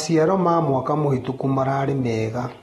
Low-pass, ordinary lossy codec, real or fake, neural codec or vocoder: 19.8 kHz; AAC, 32 kbps; real; none